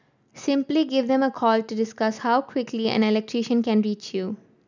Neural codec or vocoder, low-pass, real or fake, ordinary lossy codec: none; 7.2 kHz; real; none